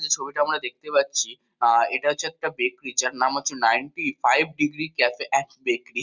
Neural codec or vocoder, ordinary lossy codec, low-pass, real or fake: none; Opus, 64 kbps; 7.2 kHz; real